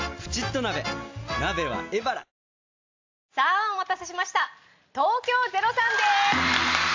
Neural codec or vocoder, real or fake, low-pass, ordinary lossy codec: none; real; 7.2 kHz; AAC, 48 kbps